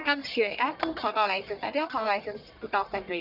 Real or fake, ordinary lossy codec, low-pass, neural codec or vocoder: fake; none; 5.4 kHz; codec, 44.1 kHz, 1.7 kbps, Pupu-Codec